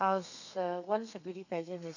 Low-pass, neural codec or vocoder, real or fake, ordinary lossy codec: 7.2 kHz; codec, 32 kHz, 1.9 kbps, SNAC; fake; none